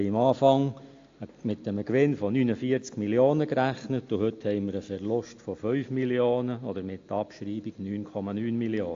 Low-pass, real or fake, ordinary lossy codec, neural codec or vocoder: 7.2 kHz; real; AAC, 48 kbps; none